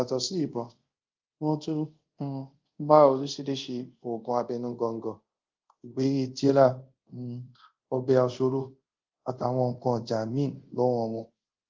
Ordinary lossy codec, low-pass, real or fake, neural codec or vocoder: Opus, 32 kbps; 7.2 kHz; fake; codec, 24 kHz, 0.5 kbps, DualCodec